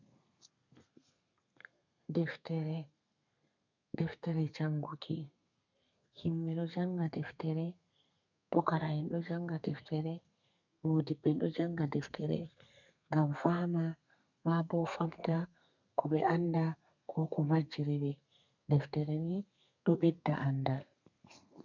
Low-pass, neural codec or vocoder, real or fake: 7.2 kHz; codec, 32 kHz, 1.9 kbps, SNAC; fake